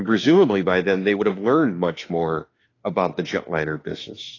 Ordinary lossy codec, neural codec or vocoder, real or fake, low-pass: AAC, 32 kbps; autoencoder, 48 kHz, 32 numbers a frame, DAC-VAE, trained on Japanese speech; fake; 7.2 kHz